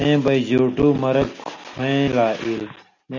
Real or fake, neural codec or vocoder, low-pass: real; none; 7.2 kHz